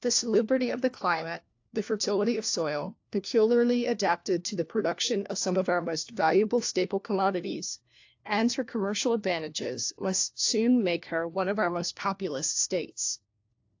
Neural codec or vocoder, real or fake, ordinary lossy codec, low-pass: codec, 16 kHz, 1 kbps, FunCodec, trained on LibriTTS, 50 frames a second; fake; AAC, 48 kbps; 7.2 kHz